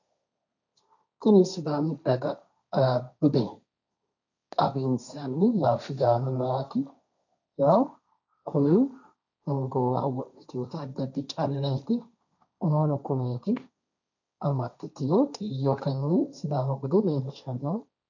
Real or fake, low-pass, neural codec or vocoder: fake; 7.2 kHz; codec, 16 kHz, 1.1 kbps, Voila-Tokenizer